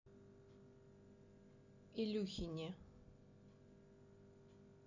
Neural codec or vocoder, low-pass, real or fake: none; 7.2 kHz; real